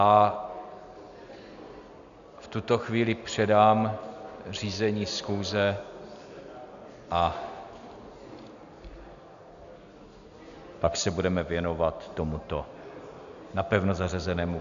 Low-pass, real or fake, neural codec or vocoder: 7.2 kHz; real; none